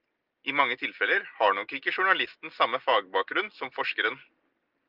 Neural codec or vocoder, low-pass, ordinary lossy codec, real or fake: none; 5.4 kHz; Opus, 24 kbps; real